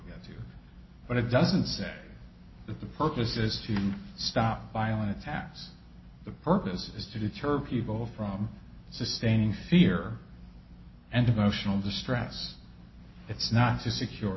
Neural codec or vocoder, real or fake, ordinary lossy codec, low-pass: none; real; MP3, 24 kbps; 7.2 kHz